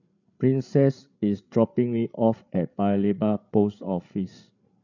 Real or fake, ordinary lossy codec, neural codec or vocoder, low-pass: fake; none; codec, 16 kHz, 8 kbps, FreqCodec, larger model; 7.2 kHz